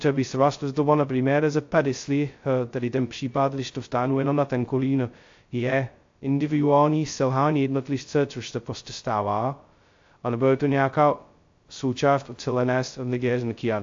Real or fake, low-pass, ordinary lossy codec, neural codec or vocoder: fake; 7.2 kHz; AAC, 48 kbps; codec, 16 kHz, 0.2 kbps, FocalCodec